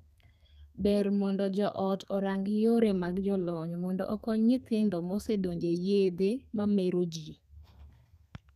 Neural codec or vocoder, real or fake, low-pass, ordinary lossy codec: codec, 32 kHz, 1.9 kbps, SNAC; fake; 14.4 kHz; none